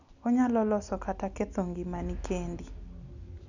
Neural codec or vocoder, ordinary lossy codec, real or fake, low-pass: none; none; real; 7.2 kHz